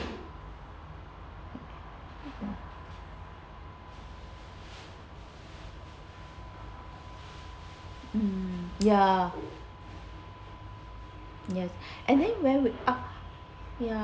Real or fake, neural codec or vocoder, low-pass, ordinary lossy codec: real; none; none; none